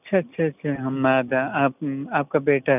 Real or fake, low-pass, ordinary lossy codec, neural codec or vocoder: real; 3.6 kHz; none; none